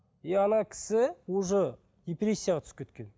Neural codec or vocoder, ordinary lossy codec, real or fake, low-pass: none; none; real; none